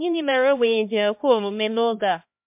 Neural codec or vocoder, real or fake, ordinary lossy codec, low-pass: codec, 16 kHz, 1 kbps, X-Codec, HuBERT features, trained on LibriSpeech; fake; MP3, 32 kbps; 3.6 kHz